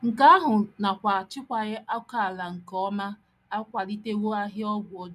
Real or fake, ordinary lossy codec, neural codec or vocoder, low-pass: real; none; none; 14.4 kHz